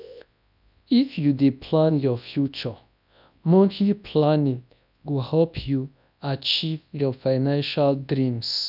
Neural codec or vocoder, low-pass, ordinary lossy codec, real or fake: codec, 24 kHz, 0.9 kbps, WavTokenizer, large speech release; 5.4 kHz; none; fake